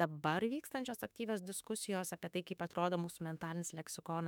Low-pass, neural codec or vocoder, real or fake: 19.8 kHz; autoencoder, 48 kHz, 32 numbers a frame, DAC-VAE, trained on Japanese speech; fake